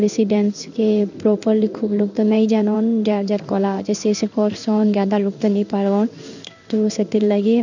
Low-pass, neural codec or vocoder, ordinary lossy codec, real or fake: 7.2 kHz; codec, 16 kHz in and 24 kHz out, 1 kbps, XY-Tokenizer; none; fake